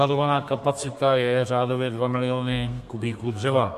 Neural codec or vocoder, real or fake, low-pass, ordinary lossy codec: codec, 32 kHz, 1.9 kbps, SNAC; fake; 14.4 kHz; MP3, 64 kbps